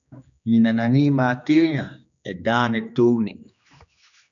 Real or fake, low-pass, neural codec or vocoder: fake; 7.2 kHz; codec, 16 kHz, 2 kbps, X-Codec, HuBERT features, trained on general audio